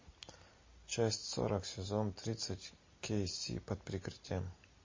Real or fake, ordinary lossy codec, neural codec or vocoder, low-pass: real; MP3, 32 kbps; none; 7.2 kHz